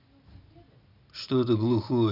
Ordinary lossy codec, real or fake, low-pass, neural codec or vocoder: none; real; 5.4 kHz; none